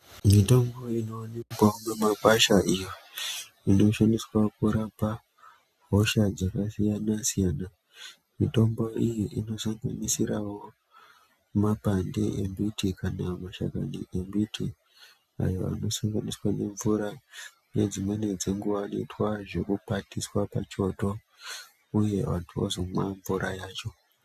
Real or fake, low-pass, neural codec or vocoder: real; 14.4 kHz; none